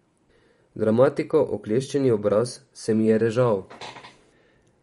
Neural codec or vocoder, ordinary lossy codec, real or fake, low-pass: vocoder, 44.1 kHz, 128 mel bands every 512 samples, BigVGAN v2; MP3, 48 kbps; fake; 19.8 kHz